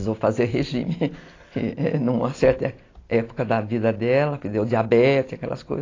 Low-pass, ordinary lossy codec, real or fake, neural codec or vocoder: 7.2 kHz; AAC, 32 kbps; real; none